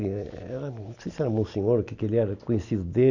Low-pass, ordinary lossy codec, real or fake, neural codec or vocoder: 7.2 kHz; none; real; none